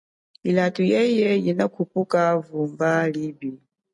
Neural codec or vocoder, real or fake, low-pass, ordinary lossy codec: none; real; 10.8 kHz; MP3, 48 kbps